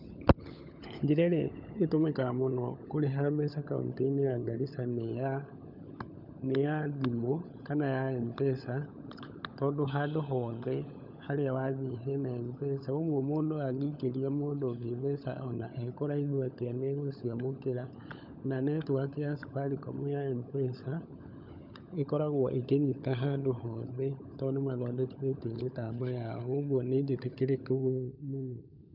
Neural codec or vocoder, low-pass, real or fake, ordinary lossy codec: codec, 16 kHz, 16 kbps, FunCodec, trained on LibriTTS, 50 frames a second; 5.4 kHz; fake; none